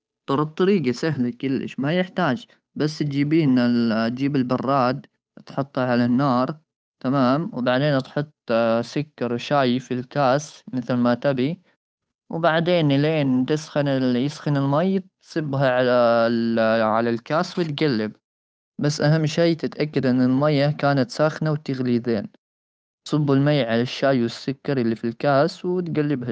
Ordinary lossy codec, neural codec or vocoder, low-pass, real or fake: none; codec, 16 kHz, 8 kbps, FunCodec, trained on Chinese and English, 25 frames a second; none; fake